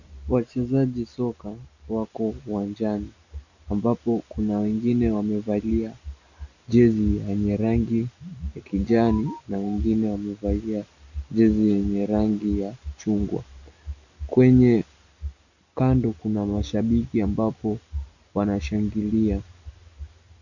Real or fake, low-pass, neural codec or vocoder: real; 7.2 kHz; none